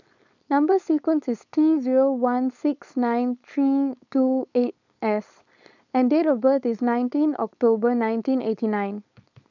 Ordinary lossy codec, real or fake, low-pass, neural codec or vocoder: none; fake; 7.2 kHz; codec, 16 kHz, 4.8 kbps, FACodec